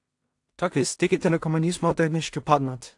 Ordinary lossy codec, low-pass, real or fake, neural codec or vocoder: AAC, 64 kbps; 10.8 kHz; fake; codec, 16 kHz in and 24 kHz out, 0.4 kbps, LongCat-Audio-Codec, two codebook decoder